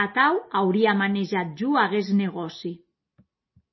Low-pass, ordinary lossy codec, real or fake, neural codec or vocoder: 7.2 kHz; MP3, 24 kbps; real; none